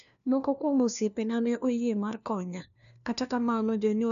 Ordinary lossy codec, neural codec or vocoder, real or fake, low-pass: none; codec, 16 kHz, 1 kbps, FunCodec, trained on LibriTTS, 50 frames a second; fake; 7.2 kHz